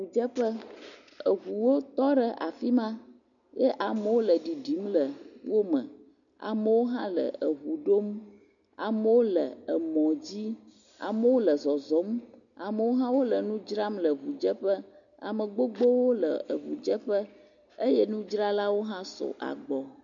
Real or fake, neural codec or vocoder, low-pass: real; none; 7.2 kHz